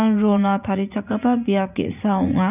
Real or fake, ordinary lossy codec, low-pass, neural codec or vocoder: fake; none; 3.6 kHz; vocoder, 44.1 kHz, 80 mel bands, Vocos